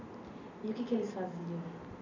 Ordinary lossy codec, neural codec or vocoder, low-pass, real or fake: none; none; 7.2 kHz; real